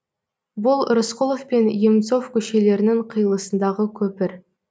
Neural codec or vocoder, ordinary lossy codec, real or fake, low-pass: none; none; real; none